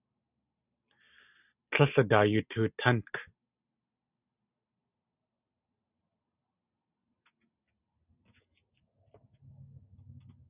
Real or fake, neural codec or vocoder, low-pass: real; none; 3.6 kHz